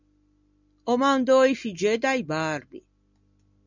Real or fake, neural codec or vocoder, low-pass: real; none; 7.2 kHz